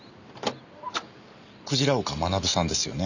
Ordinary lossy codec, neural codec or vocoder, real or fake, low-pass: none; none; real; 7.2 kHz